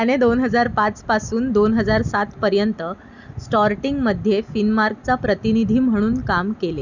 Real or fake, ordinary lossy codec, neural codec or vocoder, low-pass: real; none; none; 7.2 kHz